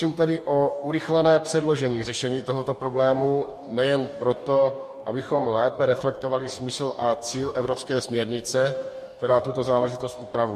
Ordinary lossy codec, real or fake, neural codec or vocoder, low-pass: MP3, 64 kbps; fake; codec, 44.1 kHz, 2.6 kbps, DAC; 14.4 kHz